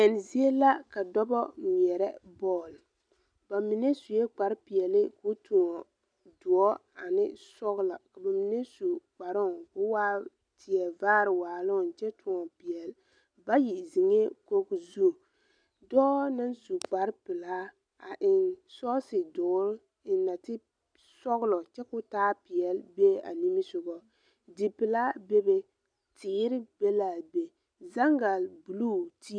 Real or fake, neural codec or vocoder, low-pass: real; none; 9.9 kHz